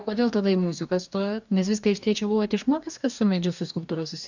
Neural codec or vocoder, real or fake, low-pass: codec, 44.1 kHz, 2.6 kbps, DAC; fake; 7.2 kHz